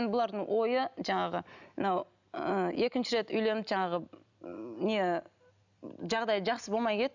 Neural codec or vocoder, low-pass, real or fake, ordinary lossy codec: none; 7.2 kHz; real; none